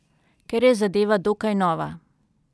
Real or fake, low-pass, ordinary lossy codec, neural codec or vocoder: real; none; none; none